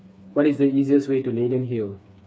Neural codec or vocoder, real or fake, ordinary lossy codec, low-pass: codec, 16 kHz, 4 kbps, FreqCodec, smaller model; fake; none; none